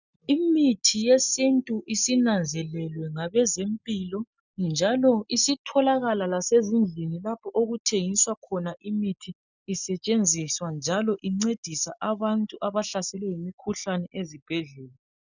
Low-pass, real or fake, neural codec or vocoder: 7.2 kHz; real; none